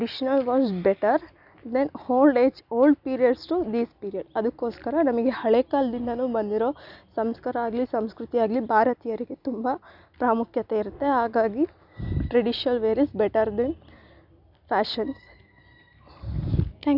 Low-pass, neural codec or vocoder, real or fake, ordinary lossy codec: 5.4 kHz; none; real; none